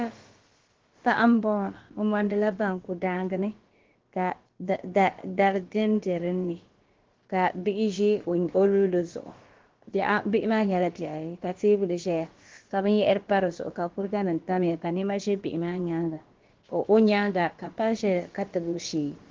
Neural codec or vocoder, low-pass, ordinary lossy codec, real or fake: codec, 16 kHz, about 1 kbps, DyCAST, with the encoder's durations; 7.2 kHz; Opus, 16 kbps; fake